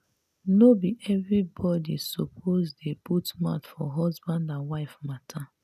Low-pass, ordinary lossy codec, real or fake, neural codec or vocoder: 14.4 kHz; none; real; none